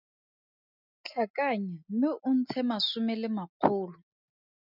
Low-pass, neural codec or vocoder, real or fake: 5.4 kHz; none; real